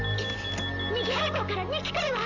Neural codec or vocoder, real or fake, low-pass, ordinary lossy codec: none; real; 7.2 kHz; none